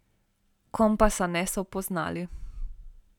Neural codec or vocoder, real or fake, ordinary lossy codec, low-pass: none; real; none; 19.8 kHz